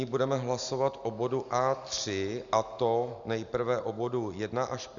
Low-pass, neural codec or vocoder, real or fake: 7.2 kHz; none; real